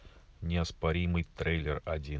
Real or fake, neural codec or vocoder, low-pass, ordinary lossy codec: real; none; none; none